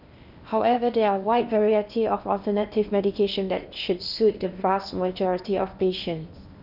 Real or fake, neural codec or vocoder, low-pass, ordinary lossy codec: fake; codec, 16 kHz in and 24 kHz out, 0.8 kbps, FocalCodec, streaming, 65536 codes; 5.4 kHz; none